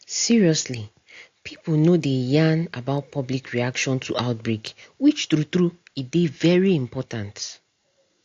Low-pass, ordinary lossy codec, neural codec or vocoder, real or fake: 7.2 kHz; AAC, 48 kbps; none; real